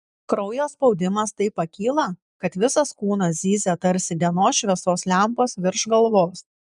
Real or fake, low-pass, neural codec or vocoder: fake; 10.8 kHz; vocoder, 24 kHz, 100 mel bands, Vocos